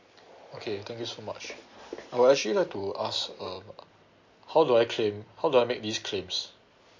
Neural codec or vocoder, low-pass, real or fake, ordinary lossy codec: none; 7.2 kHz; real; MP3, 48 kbps